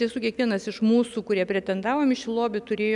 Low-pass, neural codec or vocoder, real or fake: 10.8 kHz; none; real